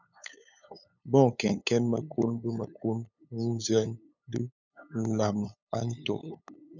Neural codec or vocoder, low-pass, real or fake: codec, 16 kHz, 8 kbps, FunCodec, trained on LibriTTS, 25 frames a second; 7.2 kHz; fake